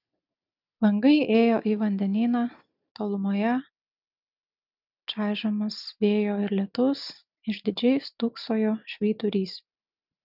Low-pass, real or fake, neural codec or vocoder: 5.4 kHz; real; none